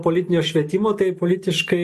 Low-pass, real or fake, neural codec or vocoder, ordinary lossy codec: 14.4 kHz; real; none; AAC, 96 kbps